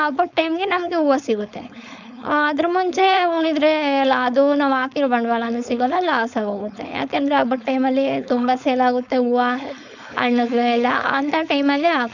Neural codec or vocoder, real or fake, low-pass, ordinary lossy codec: codec, 16 kHz, 4.8 kbps, FACodec; fake; 7.2 kHz; none